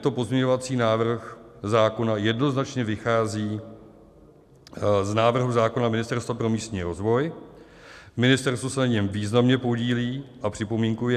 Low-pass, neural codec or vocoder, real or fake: 14.4 kHz; none; real